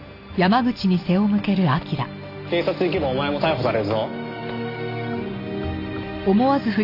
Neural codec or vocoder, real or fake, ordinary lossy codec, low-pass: none; real; AAC, 32 kbps; 5.4 kHz